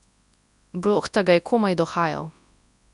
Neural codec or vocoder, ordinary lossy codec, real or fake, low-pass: codec, 24 kHz, 0.9 kbps, WavTokenizer, large speech release; none; fake; 10.8 kHz